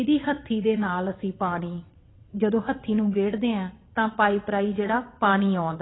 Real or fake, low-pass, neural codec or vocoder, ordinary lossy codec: fake; 7.2 kHz; vocoder, 22.05 kHz, 80 mel bands, WaveNeXt; AAC, 16 kbps